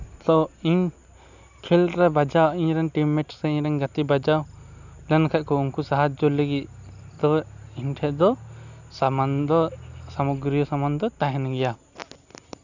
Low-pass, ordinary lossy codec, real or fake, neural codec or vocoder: 7.2 kHz; none; real; none